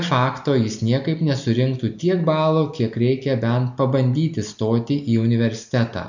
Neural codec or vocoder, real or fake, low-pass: none; real; 7.2 kHz